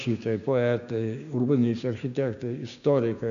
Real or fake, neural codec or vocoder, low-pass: fake; codec, 16 kHz, 6 kbps, DAC; 7.2 kHz